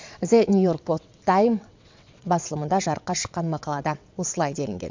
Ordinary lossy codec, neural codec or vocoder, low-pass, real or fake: MP3, 64 kbps; vocoder, 22.05 kHz, 80 mel bands, WaveNeXt; 7.2 kHz; fake